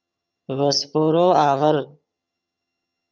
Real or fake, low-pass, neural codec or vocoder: fake; 7.2 kHz; vocoder, 22.05 kHz, 80 mel bands, HiFi-GAN